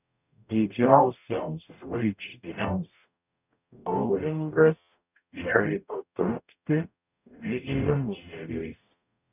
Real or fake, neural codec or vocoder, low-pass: fake; codec, 44.1 kHz, 0.9 kbps, DAC; 3.6 kHz